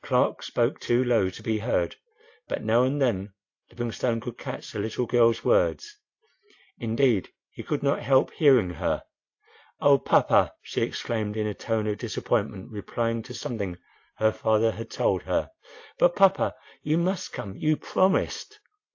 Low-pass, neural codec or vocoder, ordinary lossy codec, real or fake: 7.2 kHz; none; AAC, 48 kbps; real